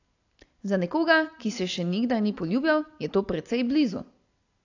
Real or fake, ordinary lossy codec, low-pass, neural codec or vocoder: real; AAC, 48 kbps; 7.2 kHz; none